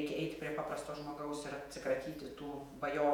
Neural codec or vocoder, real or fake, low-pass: vocoder, 48 kHz, 128 mel bands, Vocos; fake; 19.8 kHz